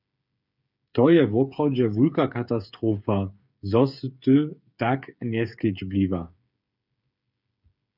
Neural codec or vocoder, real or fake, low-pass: codec, 16 kHz, 8 kbps, FreqCodec, smaller model; fake; 5.4 kHz